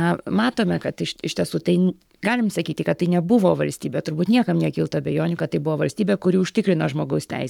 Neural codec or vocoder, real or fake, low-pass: codec, 44.1 kHz, 7.8 kbps, Pupu-Codec; fake; 19.8 kHz